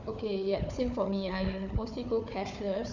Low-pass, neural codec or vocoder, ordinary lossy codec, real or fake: 7.2 kHz; codec, 16 kHz, 4 kbps, FunCodec, trained on Chinese and English, 50 frames a second; none; fake